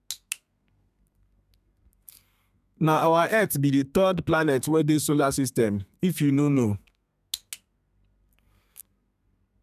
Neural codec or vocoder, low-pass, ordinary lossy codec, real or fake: codec, 32 kHz, 1.9 kbps, SNAC; 14.4 kHz; none; fake